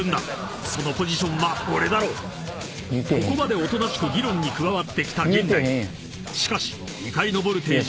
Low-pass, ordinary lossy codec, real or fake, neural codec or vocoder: none; none; real; none